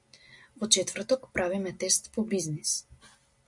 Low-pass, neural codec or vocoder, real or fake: 10.8 kHz; none; real